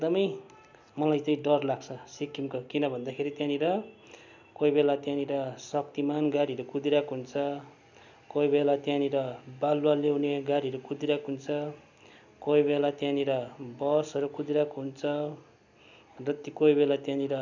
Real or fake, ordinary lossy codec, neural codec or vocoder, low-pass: real; none; none; 7.2 kHz